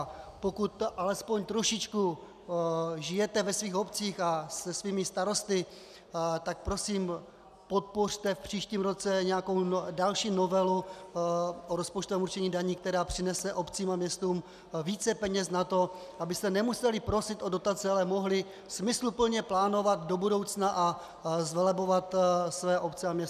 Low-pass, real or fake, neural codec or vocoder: 14.4 kHz; real; none